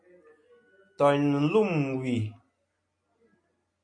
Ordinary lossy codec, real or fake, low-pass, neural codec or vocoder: MP3, 48 kbps; real; 9.9 kHz; none